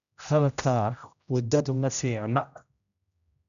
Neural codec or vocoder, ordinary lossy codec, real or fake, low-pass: codec, 16 kHz, 0.5 kbps, X-Codec, HuBERT features, trained on general audio; none; fake; 7.2 kHz